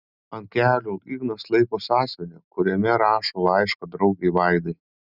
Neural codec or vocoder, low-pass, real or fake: none; 5.4 kHz; real